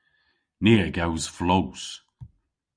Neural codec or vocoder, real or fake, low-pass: none; real; 9.9 kHz